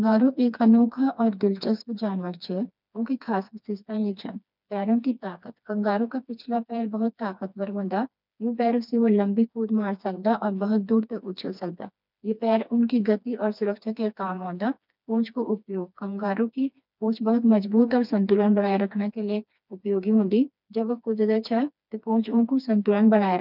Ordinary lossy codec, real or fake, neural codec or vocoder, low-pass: none; fake; codec, 16 kHz, 2 kbps, FreqCodec, smaller model; 5.4 kHz